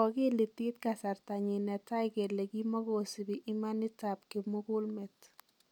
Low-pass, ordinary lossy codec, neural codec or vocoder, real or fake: 19.8 kHz; none; none; real